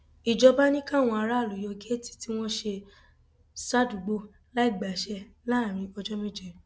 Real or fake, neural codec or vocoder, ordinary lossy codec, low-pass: real; none; none; none